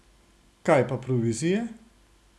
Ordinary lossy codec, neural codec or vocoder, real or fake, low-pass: none; none; real; none